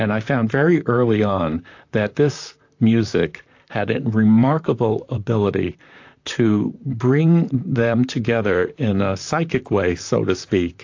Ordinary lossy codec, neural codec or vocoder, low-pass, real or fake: AAC, 48 kbps; vocoder, 44.1 kHz, 128 mel bands, Pupu-Vocoder; 7.2 kHz; fake